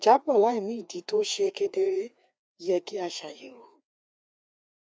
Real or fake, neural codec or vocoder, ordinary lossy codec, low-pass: fake; codec, 16 kHz, 2 kbps, FreqCodec, larger model; none; none